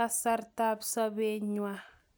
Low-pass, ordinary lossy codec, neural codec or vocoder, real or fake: none; none; none; real